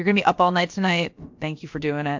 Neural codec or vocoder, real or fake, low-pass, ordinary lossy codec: codec, 16 kHz, about 1 kbps, DyCAST, with the encoder's durations; fake; 7.2 kHz; MP3, 48 kbps